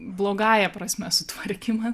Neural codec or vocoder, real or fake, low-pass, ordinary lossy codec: none; real; 14.4 kHz; Opus, 64 kbps